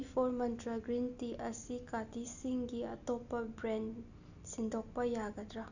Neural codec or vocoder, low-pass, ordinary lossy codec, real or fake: none; 7.2 kHz; none; real